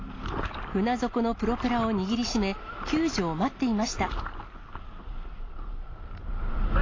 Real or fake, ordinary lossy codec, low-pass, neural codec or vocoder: real; AAC, 32 kbps; 7.2 kHz; none